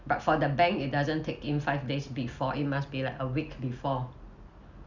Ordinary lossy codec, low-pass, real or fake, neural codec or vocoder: Opus, 64 kbps; 7.2 kHz; real; none